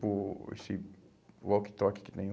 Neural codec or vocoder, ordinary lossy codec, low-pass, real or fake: none; none; none; real